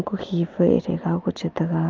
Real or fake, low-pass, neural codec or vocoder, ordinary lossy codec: real; 7.2 kHz; none; Opus, 24 kbps